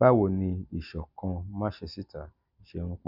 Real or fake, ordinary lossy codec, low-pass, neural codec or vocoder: real; none; 5.4 kHz; none